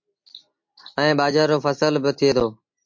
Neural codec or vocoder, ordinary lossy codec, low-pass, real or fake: none; MP3, 48 kbps; 7.2 kHz; real